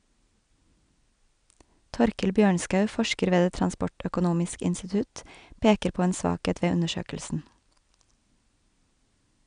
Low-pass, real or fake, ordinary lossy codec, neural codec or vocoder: 9.9 kHz; real; none; none